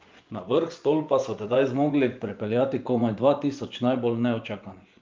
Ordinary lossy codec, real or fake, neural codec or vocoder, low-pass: Opus, 32 kbps; fake; vocoder, 24 kHz, 100 mel bands, Vocos; 7.2 kHz